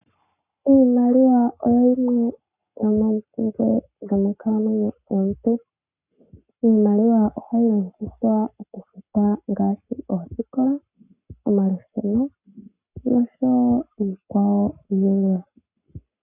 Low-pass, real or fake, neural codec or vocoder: 3.6 kHz; fake; codec, 44.1 kHz, 7.8 kbps, Pupu-Codec